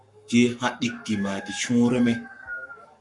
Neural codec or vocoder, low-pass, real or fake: codec, 44.1 kHz, 7.8 kbps, Pupu-Codec; 10.8 kHz; fake